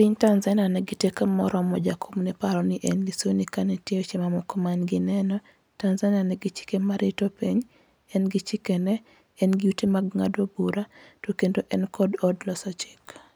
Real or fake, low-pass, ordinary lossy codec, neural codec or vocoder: real; none; none; none